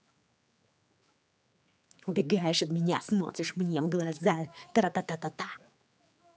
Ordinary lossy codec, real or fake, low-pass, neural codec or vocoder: none; fake; none; codec, 16 kHz, 4 kbps, X-Codec, HuBERT features, trained on general audio